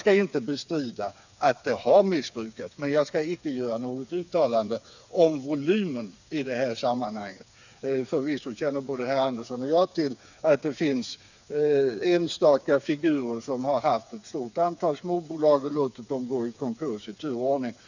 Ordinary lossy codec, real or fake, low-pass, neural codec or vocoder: none; fake; 7.2 kHz; codec, 16 kHz, 4 kbps, FreqCodec, smaller model